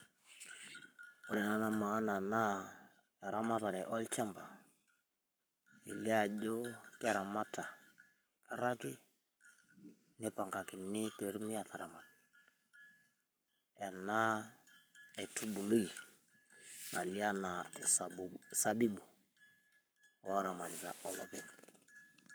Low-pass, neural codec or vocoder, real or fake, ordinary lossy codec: none; codec, 44.1 kHz, 7.8 kbps, Pupu-Codec; fake; none